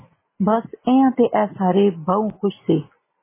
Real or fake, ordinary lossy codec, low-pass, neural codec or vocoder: real; MP3, 16 kbps; 3.6 kHz; none